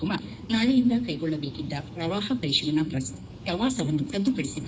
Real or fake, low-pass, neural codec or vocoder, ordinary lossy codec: fake; none; codec, 16 kHz, 4 kbps, X-Codec, HuBERT features, trained on general audio; none